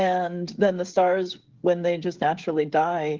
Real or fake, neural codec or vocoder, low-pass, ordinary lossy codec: fake; codec, 16 kHz, 16 kbps, FreqCodec, smaller model; 7.2 kHz; Opus, 16 kbps